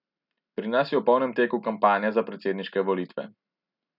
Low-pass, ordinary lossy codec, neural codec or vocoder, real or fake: 5.4 kHz; none; none; real